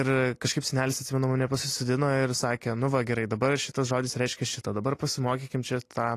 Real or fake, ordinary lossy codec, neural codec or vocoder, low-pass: real; AAC, 48 kbps; none; 14.4 kHz